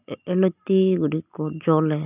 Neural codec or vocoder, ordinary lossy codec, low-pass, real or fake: none; none; 3.6 kHz; real